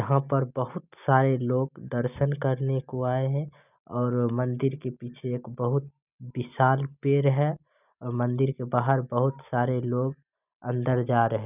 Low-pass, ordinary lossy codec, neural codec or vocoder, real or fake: 3.6 kHz; none; none; real